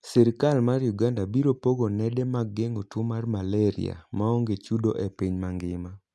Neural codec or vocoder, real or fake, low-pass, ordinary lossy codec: none; real; none; none